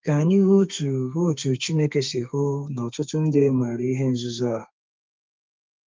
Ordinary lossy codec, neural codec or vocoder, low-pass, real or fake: Opus, 24 kbps; codec, 32 kHz, 1.9 kbps, SNAC; 7.2 kHz; fake